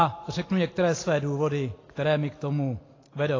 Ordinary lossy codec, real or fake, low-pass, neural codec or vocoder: AAC, 32 kbps; real; 7.2 kHz; none